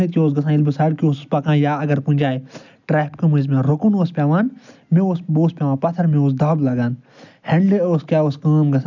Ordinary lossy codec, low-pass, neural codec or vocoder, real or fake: none; 7.2 kHz; none; real